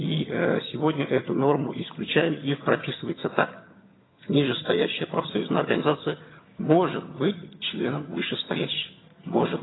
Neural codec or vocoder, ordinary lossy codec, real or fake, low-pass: vocoder, 22.05 kHz, 80 mel bands, HiFi-GAN; AAC, 16 kbps; fake; 7.2 kHz